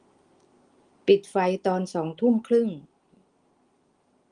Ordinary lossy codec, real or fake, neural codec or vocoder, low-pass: Opus, 24 kbps; real; none; 9.9 kHz